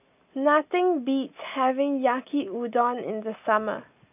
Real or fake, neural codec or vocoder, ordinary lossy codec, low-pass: real; none; none; 3.6 kHz